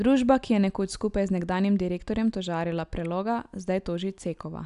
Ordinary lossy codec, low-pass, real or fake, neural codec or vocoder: none; 10.8 kHz; real; none